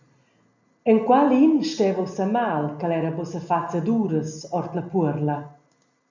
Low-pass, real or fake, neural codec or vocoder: 7.2 kHz; real; none